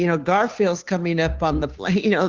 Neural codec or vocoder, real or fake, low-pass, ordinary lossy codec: codec, 16 kHz, 2 kbps, FunCodec, trained on Chinese and English, 25 frames a second; fake; 7.2 kHz; Opus, 32 kbps